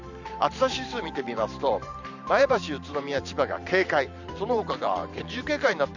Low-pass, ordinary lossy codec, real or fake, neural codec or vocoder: 7.2 kHz; none; fake; vocoder, 44.1 kHz, 128 mel bands every 512 samples, BigVGAN v2